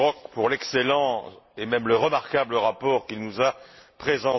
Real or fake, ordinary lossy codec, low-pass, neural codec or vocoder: real; MP3, 24 kbps; 7.2 kHz; none